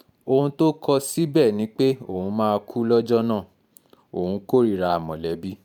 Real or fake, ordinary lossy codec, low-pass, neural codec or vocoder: fake; none; 19.8 kHz; vocoder, 44.1 kHz, 128 mel bands every 512 samples, BigVGAN v2